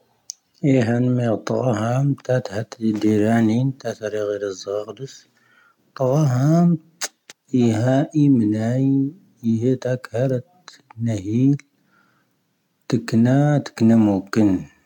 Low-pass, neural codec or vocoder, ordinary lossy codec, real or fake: 19.8 kHz; none; none; real